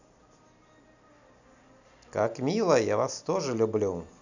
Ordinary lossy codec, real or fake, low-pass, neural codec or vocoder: none; real; 7.2 kHz; none